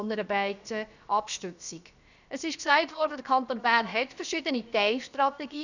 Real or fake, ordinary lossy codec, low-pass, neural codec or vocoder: fake; none; 7.2 kHz; codec, 16 kHz, about 1 kbps, DyCAST, with the encoder's durations